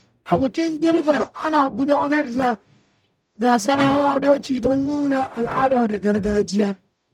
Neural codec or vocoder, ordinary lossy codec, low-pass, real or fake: codec, 44.1 kHz, 0.9 kbps, DAC; none; 19.8 kHz; fake